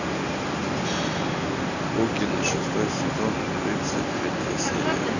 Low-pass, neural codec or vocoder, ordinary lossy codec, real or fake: 7.2 kHz; none; none; real